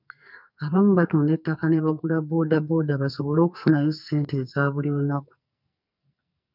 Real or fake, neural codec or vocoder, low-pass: fake; codec, 44.1 kHz, 2.6 kbps, SNAC; 5.4 kHz